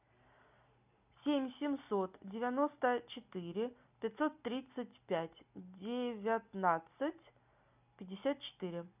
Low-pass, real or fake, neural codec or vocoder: 3.6 kHz; real; none